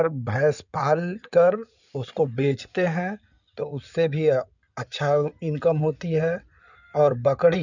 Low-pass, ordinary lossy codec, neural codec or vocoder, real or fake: 7.2 kHz; none; codec, 16 kHz in and 24 kHz out, 2.2 kbps, FireRedTTS-2 codec; fake